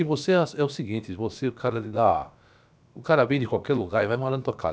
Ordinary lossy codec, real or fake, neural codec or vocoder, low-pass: none; fake; codec, 16 kHz, about 1 kbps, DyCAST, with the encoder's durations; none